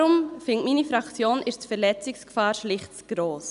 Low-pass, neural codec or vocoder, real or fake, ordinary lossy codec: 10.8 kHz; none; real; none